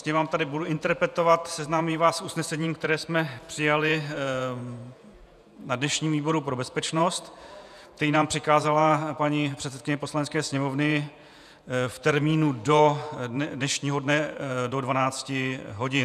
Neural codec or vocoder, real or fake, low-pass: vocoder, 48 kHz, 128 mel bands, Vocos; fake; 14.4 kHz